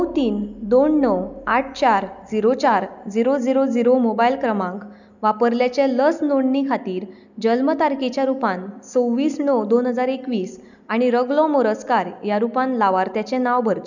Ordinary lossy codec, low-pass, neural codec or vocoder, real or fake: none; 7.2 kHz; none; real